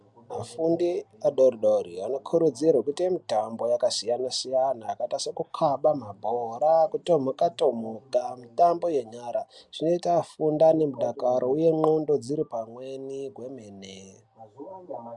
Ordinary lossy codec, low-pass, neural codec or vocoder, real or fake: MP3, 96 kbps; 10.8 kHz; none; real